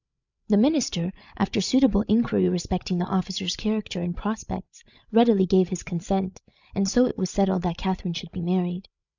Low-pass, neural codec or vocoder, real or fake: 7.2 kHz; codec, 16 kHz, 16 kbps, FreqCodec, larger model; fake